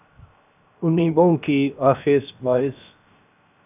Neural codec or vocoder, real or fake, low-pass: codec, 16 kHz, 0.7 kbps, FocalCodec; fake; 3.6 kHz